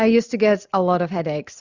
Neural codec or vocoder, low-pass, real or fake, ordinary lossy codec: none; 7.2 kHz; real; Opus, 64 kbps